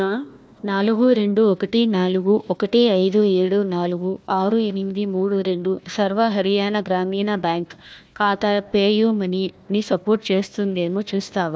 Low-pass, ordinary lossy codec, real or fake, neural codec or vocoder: none; none; fake; codec, 16 kHz, 1 kbps, FunCodec, trained on Chinese and English, 50 frames a second